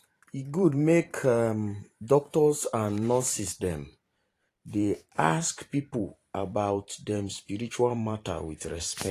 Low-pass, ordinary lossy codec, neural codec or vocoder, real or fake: 14.4 kHz; AAC, 48 kbps; vocoder, 44.1 kHz, 128 mel bands every 512 samples, BigVGAN v2; fake